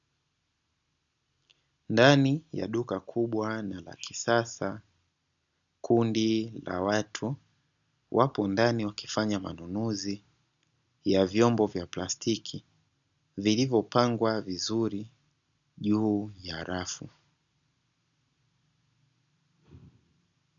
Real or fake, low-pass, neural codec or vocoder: real; 7.2 kHz; none